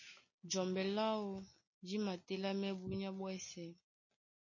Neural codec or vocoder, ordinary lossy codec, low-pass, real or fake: none; MP3, 32 kbps; 7.2 kHz; real